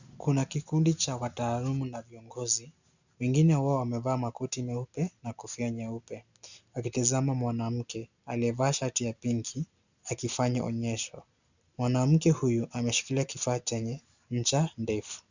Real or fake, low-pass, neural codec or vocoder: real; 7.2 kHz; none